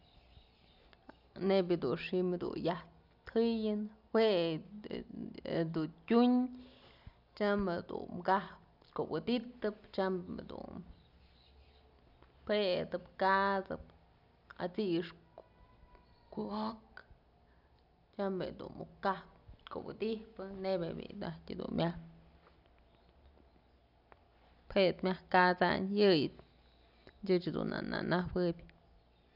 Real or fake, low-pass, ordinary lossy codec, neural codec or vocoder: real; 5.4 kHz; none; none